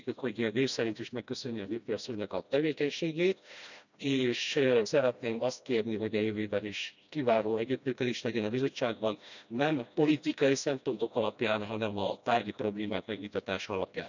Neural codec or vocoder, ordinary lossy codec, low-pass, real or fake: codec, 16 kHz, 1 kbps, FreqCodec, smaller model; none; 7.2 kHz; fake